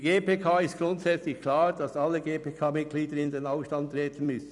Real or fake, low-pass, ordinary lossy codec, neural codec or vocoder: fake; 10.8 kHz; none; vocoder, 44.1 kHz, 128 mel bands every 256 samples, BigVGAN v2